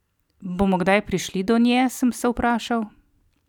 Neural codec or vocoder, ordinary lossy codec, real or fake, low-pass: none; none; real; 19.8 kHz